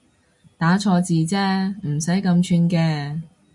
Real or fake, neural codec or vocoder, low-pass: real; none; 10.8 kHz